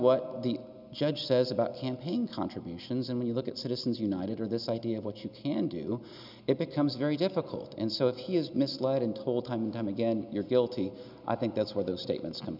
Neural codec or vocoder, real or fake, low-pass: none; real; 5.4 kHz